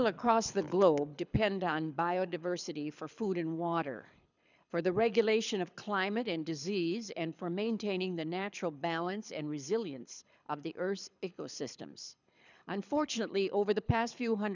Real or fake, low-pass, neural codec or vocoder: fake; 7.2 kHz; codec, 24 kHz, 6 kbps, HILCodec